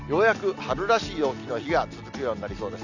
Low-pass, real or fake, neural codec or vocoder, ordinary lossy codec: 7.2 kHz; real; none; none